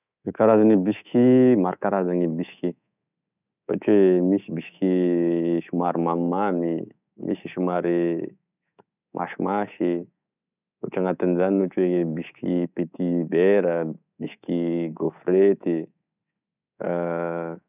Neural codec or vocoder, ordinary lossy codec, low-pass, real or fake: codec, 24 kHz, 3.1 kbps, DualCodec; none; 3.6 kHz; fake